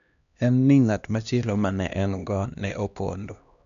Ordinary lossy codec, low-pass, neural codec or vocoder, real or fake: none; 7.2 kHz; codec, 16 kHz, 1 kbps, X-Codec, HuBERT features, trained on LibriSpeech; fake